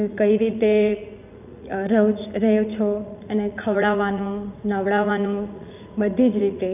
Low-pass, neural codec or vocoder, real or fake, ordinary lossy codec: 3.6 kHz; vocoder, 44.1 kHz, 80 mel bands, Vocos; fake; none